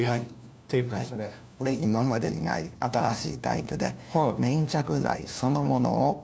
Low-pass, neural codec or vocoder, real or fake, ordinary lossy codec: none; codec, 16 kHz, 1 kbps, FunCodec, trained on LibriTTS, 50 frames a second; fake; none